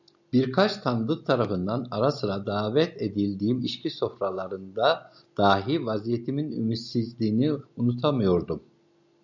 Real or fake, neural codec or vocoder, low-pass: real; none; 7.2 kHz